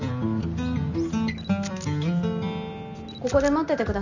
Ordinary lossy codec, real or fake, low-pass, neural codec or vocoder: none; real; 7.2 kHz; none